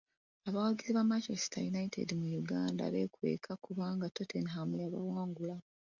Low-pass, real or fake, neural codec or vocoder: 7.2 kHz; real; none